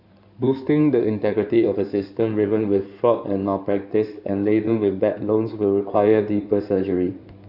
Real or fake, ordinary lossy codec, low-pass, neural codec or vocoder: fake; none; 5.4 kHz; codec, 16 kHz in and 24 kHz out, 2.2 kbps, FireRedTTS-2 codec